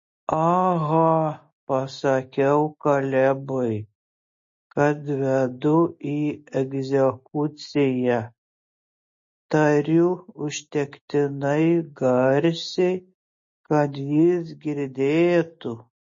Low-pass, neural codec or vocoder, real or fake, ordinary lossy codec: 7.2 kHz; none; real; MP3, 32 kbps